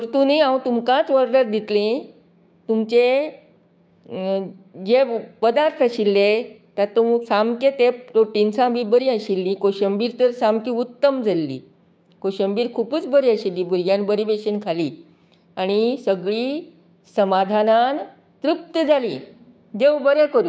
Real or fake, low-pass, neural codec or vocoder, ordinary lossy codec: fake; none; codec, 16 kHz, 6 kbps, DAC; none